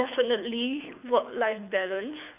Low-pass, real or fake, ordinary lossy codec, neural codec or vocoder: 3.6 kHz; fake; none; codec, 24 kHz, 6 kbps, HILCodec